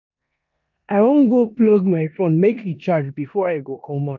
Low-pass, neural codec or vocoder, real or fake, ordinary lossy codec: 7.2 kHz; codec, 16 kHz in and 24 kHz out, 0.9 kbps, LongCat-Audio-Codec, four codebook decoder; fake; none